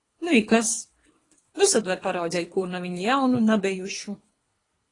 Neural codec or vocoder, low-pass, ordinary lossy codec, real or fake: codec, 24 kHz, 3 kbps, HILCodec; 10.8 kHz; AAC, 32 kbps; fake